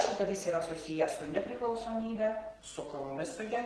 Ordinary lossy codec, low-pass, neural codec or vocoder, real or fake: Opus, 16 kbps; 10.8 kHz; codec, 44.1 kHz, 2.6 kbps, SNAC; fake